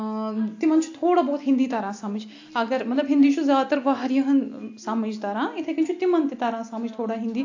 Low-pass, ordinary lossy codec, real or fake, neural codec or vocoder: 7.2 kHz; AAC, 48 kbps; real; none